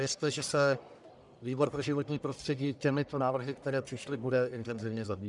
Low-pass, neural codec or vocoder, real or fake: 10.8 kHz; codec, 44.1 kHz, 1.7 kbps, Pupu-Codec; fake